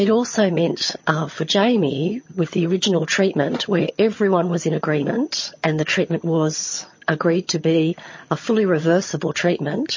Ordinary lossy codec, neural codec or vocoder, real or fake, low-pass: MP3, 32 kbps; vocoder, 22.05 kHz, 80 mel bands, HiFi-GAN; fake; 7.2 kHz